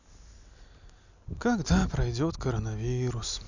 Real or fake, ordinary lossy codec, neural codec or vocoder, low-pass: real; none; none; 7.2 kHz